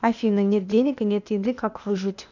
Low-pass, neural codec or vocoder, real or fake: 7.2 kHz; codec, 16 kHz, 0.8 kbps, ZipCodec; fake